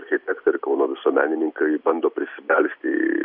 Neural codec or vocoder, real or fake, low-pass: none; real; 5.4 kHz